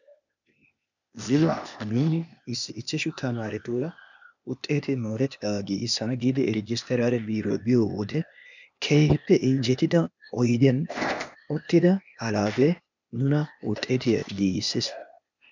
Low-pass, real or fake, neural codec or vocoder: 7.2 kHz; fake; codec, 16 kHz, 0.8 kbps, ZipCodec